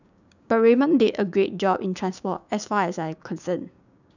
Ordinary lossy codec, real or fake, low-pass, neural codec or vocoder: none; fake; 7.2 kHz; codec, 16 kHz, 6 kbps, DAC